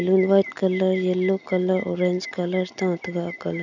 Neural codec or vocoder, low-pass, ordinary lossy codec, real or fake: none; 7.2 kHz; none; real